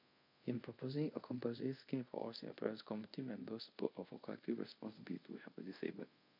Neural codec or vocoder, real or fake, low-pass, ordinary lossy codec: codec, 24 kHz, 0.5 kbps, DualCodec; fake; 5.4 kHz; MP3, 48 kbps